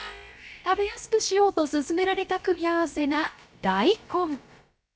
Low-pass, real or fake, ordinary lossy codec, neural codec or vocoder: none; fake; none; codec, 16 kHz, about 1 kbps, DyCAST, with the encoder's durations